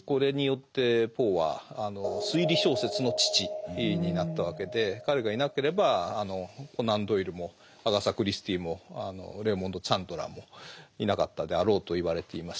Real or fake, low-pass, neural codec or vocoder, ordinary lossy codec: real; none; none; none